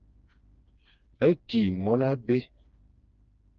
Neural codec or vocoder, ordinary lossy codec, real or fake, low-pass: codec, 16 kHz, 2 kbps, FreqCodec, smaller model; Opus, 24 kbps; fake; 7.2 kHz